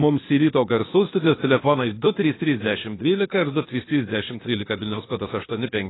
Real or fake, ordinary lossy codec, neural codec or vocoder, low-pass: fake; AAC, 16 kbps; codec, 16 kHz, 0.8 kbps, ZipCodec; 7.2 kHz